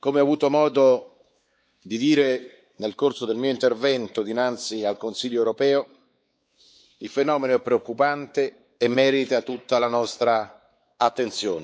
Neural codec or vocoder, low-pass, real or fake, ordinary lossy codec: codec, 16 kHz, 4 kbps, X-Codec, WavLM features, trained on Multilingual LibriSpeech; none; fake; none